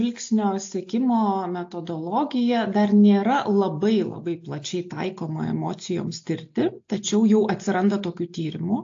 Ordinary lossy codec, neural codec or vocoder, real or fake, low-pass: AAC, 48 kbps; none; real; 7.2 kHz